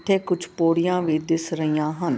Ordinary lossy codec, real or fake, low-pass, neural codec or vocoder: none; real; none; none